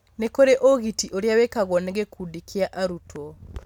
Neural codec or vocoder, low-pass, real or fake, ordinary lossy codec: none; 19.8 kHz; real; none